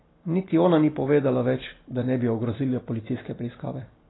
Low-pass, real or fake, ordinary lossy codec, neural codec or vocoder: 7.2 kHz; real; AAC, 16 kbps; none